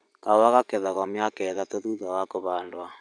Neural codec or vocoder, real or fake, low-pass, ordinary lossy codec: vocoder, 24 kHz, 100 mel bands, Vocos; fake; 9.9 kHz; none